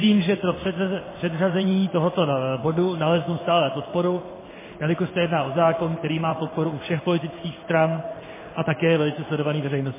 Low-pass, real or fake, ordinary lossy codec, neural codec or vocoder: 3.6 kHz; fake; MP3, 16 kbps; codec, 16 kHz in and 24 kHz out, 1 kbps, XY-Tokenizer